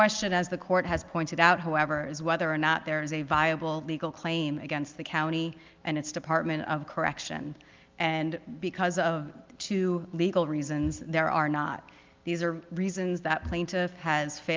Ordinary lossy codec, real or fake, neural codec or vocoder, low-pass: Opus, 24 kbps; real; none; 7.2 kHz